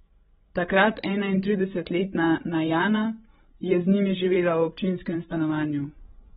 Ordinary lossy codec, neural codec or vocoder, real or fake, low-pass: AAC, 16 kbps; codec, 16 kHz, 16 kbps, FreqCodec, larger model; fake; 7.2 kHz